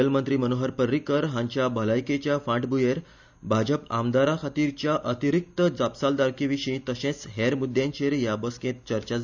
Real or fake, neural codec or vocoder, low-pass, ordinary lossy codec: real; none; 7.2 kHz; none